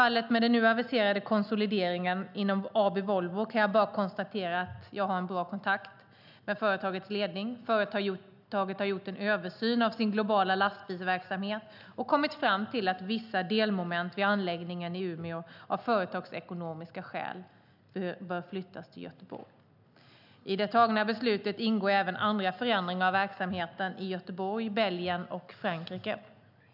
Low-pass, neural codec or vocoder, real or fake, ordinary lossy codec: 5.4 kHz; none; real; none